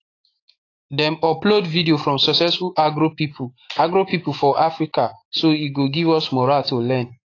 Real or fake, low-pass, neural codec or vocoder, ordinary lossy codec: fake; 7.2 kHz; autoencoder, 48 kHz, 128 numbers a frame, DAC-VAE, trained on Japanese speech; AAC, 32 kbps